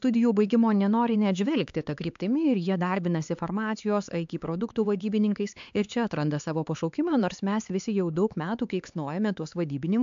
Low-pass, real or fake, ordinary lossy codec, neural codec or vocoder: 7.2 kHz; fake; AAC, 64 kbps; codec, 16 kHz, 4 kbps, X-Codec, HuBERT features, trained on LibriSpeech